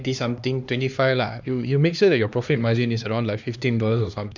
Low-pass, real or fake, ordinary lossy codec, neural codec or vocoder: 7.2 kHz; fake; none; codec, 16 kHz, 2 kbps, X-Codec, HuBERT features, trained on LibriSpeech